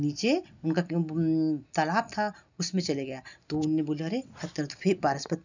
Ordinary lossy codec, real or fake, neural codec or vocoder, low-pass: none; real; none; 7.2 kHz